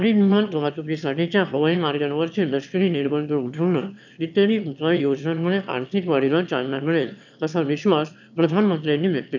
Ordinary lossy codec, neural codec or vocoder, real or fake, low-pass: none; autoencoder, 22.05 kHz, a latent of 192 numbers a frame, VITS, trained on one speaker; fake; 7.2 kHz